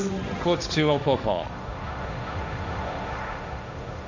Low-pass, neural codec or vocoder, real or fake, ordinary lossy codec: 7.2 kHz; codec, 16 kHz, 1.1 kbps, Voila-Tokenizer; fake; none